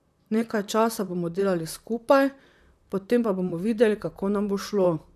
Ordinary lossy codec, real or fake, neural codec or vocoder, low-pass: none; fake; vocoder, 44.1 kHz, 128 mel bands, Pupu-Vocoder; 14.4 kHz